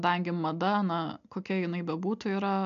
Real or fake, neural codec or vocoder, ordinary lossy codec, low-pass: real; none; AAC, 64 kbps; 7.2 kHz